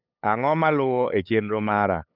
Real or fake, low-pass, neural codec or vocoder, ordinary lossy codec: fake; 5.4 kHz; codec, 16 kHz, 8 kbps, FunCodec, trained on LibriTTS, 25 frames a second; none